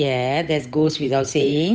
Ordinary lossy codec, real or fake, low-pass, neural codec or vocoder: none; fake; none; codec, 16 kHz, 8 kbps, FunCodec, trained on Chinese and English, 25 frames a second